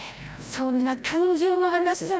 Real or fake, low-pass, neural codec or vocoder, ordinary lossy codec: fake; none; codec, 16 kHz, 0.5 kbps, FreqCodec, larger model; none